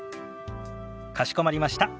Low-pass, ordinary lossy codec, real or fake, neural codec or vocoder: none; none; real; none